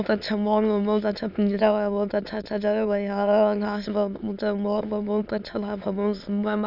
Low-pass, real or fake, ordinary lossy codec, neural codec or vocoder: 5.4 kHz; fake; none; autoencoder, 22.05 kHz, a latent of 192 numbers a frame, VITS, trained on many speakers